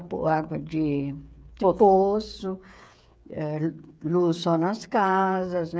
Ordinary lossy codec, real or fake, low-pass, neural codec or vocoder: none; fake; none; codec, 16 kHz, 8 kbps, FreqCodec, smaller model